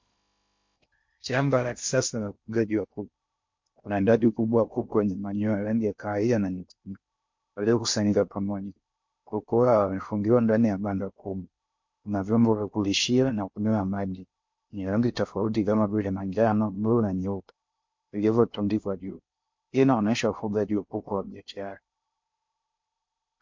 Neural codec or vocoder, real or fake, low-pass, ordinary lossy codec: codec, 16 kHz in and 24 kHz out, 0.6 kbps, FocalCodec, streaming, 2048 codes; fake; 7.2 kHz; MP3, 48 kbps